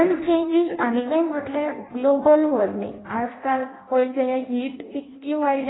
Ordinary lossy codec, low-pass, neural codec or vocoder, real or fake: AAC, 16 kbps; 7.2 kHz; codec, 16 kHz in and 24 kHz out, 0.6 kbps, FireRedTTS-2 codec; fake